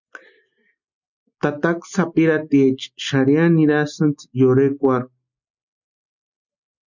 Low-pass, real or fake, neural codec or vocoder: 7.2 kHz; real; none